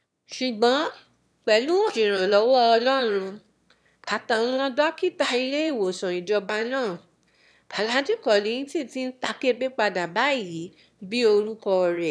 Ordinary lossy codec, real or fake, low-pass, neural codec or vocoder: none; fake; none; autoencoder, 22.05 kHz, a latent of 192 numbers a frame, VITS, trained on one speaker